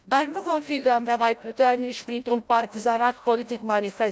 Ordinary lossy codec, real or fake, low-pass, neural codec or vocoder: none; fake; none; codec, 16 kHz, 0.5 kbps, FreqCodec, larger model